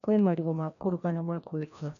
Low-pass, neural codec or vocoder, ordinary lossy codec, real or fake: 7.2 kHz; codec, 16 kHz, 1 kbps, FreqCodec, larger model; none; fake